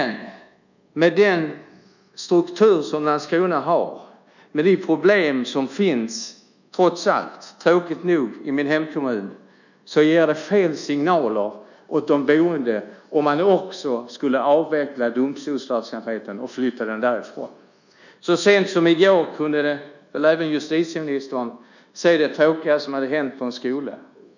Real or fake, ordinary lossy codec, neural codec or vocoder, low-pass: fake; none; codec, 24 kHz, 1.2 kbps, DualCodec; 7.2 kHz